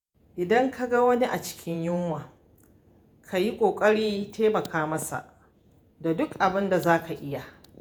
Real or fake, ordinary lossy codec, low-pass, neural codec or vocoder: fake; none; none; vocoder, 48 kHz, 128 mel bands, Vocos